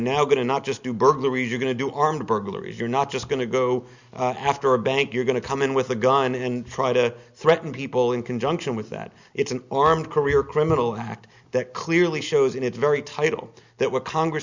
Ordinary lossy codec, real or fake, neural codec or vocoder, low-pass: Opus, 64 kbps; real; none; 7.2 kHz